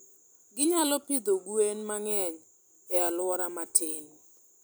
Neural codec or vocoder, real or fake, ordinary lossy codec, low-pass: vocoder, 44.1 kHz, 128 mel bands every 256 samples, BigVGAN v2; fake; none; none